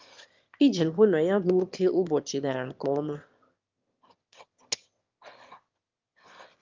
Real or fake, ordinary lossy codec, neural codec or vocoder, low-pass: fake; Opus, 24 kbps; autoencoder, 22.05 kHz, a latent of 192 numbers a frame, VITS, trained on one speaker; 7.2 kHz